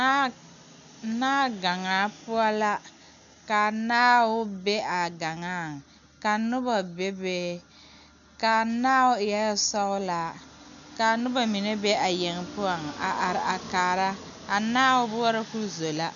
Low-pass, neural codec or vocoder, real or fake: 7.2 kHz; none; real